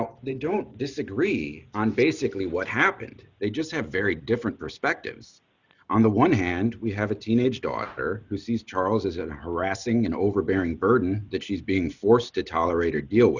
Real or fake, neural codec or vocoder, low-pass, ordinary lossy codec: real; none; 7.2 kHz; Opus, 64 kbps